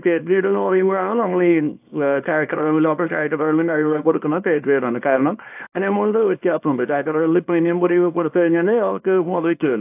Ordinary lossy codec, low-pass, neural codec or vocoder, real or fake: AAC, 32 kbps; 3.6 kHz; codec, 24 kHz, 0.9 kbps, WavTokenizer, small release; fake